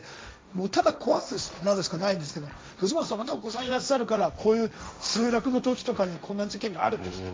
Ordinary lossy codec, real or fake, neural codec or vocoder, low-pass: none; fake; codec, 16 kHz, 1.1 kbps, Voila-Tokenizer; none